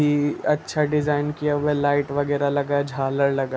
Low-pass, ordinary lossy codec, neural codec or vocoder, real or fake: none; none; none; real